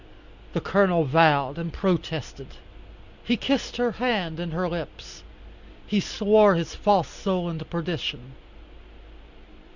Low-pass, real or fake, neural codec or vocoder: 7.2 kHz; real; none